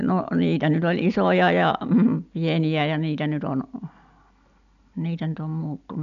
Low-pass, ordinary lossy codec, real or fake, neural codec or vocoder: 7.2 kHz; none; real; none